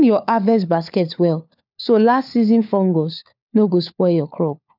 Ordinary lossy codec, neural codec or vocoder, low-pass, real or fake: none; codec, 16 kHz, 4 kbps, FunCodec, trained on LibriTTS, 50 frames a second; 5.4 kHz; fake